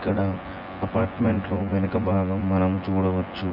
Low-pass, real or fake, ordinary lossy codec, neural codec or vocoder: 5.4 kHz; fake; MP3, 48 kbps; vocoder, 24 kHz, 100 mel bands, Vocos